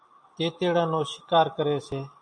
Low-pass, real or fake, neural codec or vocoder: 9.9 kHz; real; none